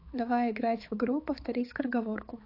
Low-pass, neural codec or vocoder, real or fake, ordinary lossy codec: 5.4 kHz; codec, 16 kHz, 4 kbps, X-Codec, HuBERT features, trained on balanced general audio; fake; AAC, 32 kbps